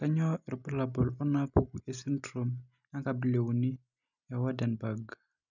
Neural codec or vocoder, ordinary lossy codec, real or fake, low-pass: none; none; real; 7.2 kHz